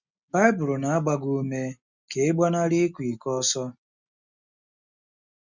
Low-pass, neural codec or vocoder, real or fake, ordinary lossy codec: 7.2 kHz; none; real; Opus, 64 kbps